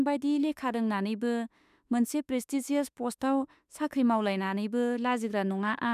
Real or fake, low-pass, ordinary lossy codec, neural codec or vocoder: fake; 14.4 kHz; none; autoencoder, 48 kHz, 32 numbers a frame, DAC-VAE, trained on Japanese speech